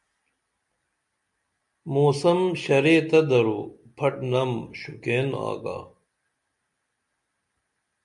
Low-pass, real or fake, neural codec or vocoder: 10.8 kHz; real; none